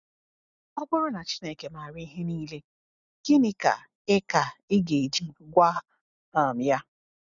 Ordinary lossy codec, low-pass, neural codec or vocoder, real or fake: none; 7.2 kHz; none; real